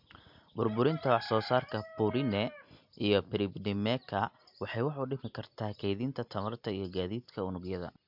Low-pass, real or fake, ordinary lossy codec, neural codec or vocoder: 5.4 kHz; real; MP3, 48 kbps; none